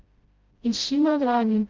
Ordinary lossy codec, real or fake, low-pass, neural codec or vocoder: Opus, 24 kbps; fake; 7.2 kHz; codec, 16 kHz, 0.5 kbps, FreqCodec, smaller model